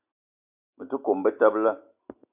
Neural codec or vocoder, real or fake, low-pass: none; real; 3.6 kHz